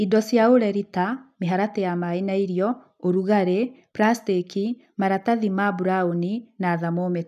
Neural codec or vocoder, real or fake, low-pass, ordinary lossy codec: none; real; 14.4 kHz; none